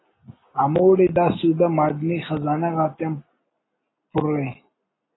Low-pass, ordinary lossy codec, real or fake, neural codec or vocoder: 7.2 kHz; AAC, 16 kbps; real; none